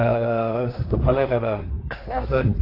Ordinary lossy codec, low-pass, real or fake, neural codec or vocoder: AAC, 32 kbps; 5.4 kHz; fake; codec, 24 kHz, 1 kbps, SNAC